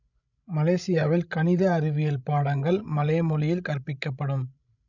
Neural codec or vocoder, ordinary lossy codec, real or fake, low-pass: codec, 16 kHz, 16 kbps, FreqCodec, larger model; none; fake; 7.2 kHz